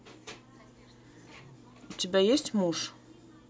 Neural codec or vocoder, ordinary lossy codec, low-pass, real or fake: none; none; none; real